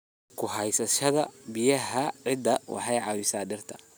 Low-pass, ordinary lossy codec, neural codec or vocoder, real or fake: none; none; none; real